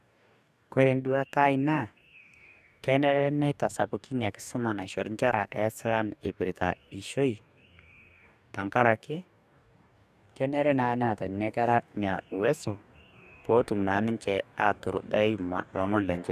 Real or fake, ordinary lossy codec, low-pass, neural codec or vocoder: fake; none; 14.4 kHz; codec, 44.1 kHz, 2.6 kbps, DAC